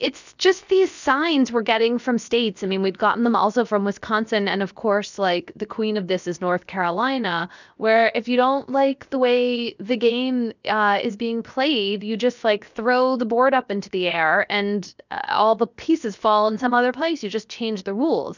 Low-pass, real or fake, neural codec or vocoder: 7.2 kHz; fake; codec, 16 kHz, 0.7 kbps, FocalCodec